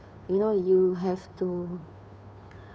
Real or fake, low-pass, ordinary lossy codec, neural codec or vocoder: fake; none; none; codec, 16 kHz, 2 kbps, FunCodec, trained on Chinese and English, 25 frames a second